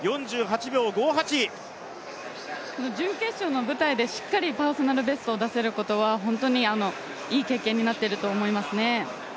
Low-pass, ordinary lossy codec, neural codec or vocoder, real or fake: none; none; none; real